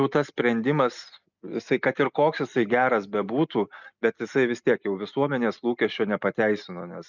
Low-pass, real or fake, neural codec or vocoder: 7.2 kHz; real; none